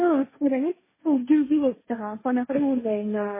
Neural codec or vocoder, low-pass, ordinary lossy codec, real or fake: codec, 16 kHz, 1.1 kbps, Voila-Tokenizer; 3.6 kHz; MP3, 16 kbps; fake